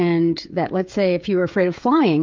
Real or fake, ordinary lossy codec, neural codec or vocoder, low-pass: fake; Opus, 24 kbps; codec, 16 kHz, 16 kbps, FreqCodec, smaller model; 7.2 kHz